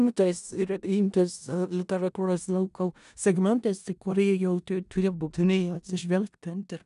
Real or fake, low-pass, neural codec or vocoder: fake; 10.8 kHz; codec, 16 kHz in and 24 kHz out, 0.4 kbps, LongCat-Audio-Codec, four codebook decoder